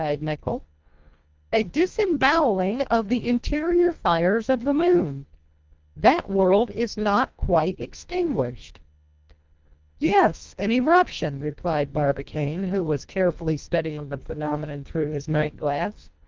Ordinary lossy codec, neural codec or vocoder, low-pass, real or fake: Opus, 24 kbps; codec, 24 kHz, 1.5 kbps, HILCodec; 7.2 kHz; fake